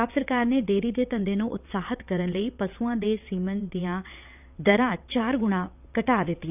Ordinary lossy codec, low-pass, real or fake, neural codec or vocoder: none; 3.6 kHz; fake; vocoder, 22.05 kHz, 80 mel bands, WaveNeXt